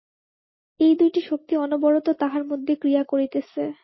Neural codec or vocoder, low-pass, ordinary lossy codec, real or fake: none; 7.2 kHz; MP3, 24 kbps; real